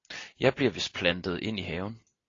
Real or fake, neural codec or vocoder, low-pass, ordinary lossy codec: real; none; 7.2 kHz; AAC, 32 kbps